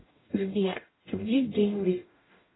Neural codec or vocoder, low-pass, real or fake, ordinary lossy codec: codec, 44.1 kHz, 0.9 kbps, DAC; 7.2 kHz; fake; AAC, 16 kbps